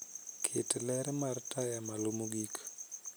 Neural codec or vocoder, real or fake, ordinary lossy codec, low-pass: none; real; none; none